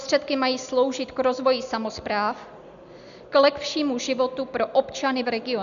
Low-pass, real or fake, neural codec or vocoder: 7.2 kHz; real; none